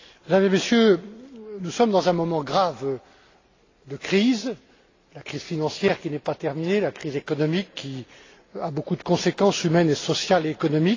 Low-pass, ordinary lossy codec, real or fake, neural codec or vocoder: 7.2 kHz; AAC, 32 kbps; real; none